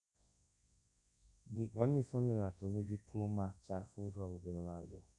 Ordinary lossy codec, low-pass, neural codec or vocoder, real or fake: AAC, 48 kbps; 10.8 kHz; codec, 24 kHz, 0.9 kbps, WavTokenizer, large speech release; fake